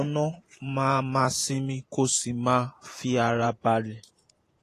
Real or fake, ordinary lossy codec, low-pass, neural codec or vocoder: fake; AAC, 48 kbps; 14.4 kHz; vocoder, 48 kHz, 128 mel bands, Vocos